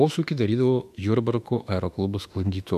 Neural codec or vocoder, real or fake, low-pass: autoencoder, 48 kHz, 32 numbers a frame, DAC-VAE, trained on Japanese speech; fake; 14.4 kHz